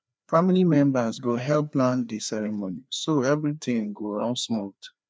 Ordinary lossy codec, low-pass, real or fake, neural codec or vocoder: none; none; fake; codec, 16 kHz, 2 kbps, FreqCodec, larger model